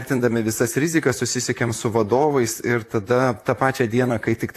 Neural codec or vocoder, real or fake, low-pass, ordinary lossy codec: vocoder, 44.1 kHz, 128 mel bands, Pupu-Vocoder; fake; 14.4 kHz; AAC, 64 kbps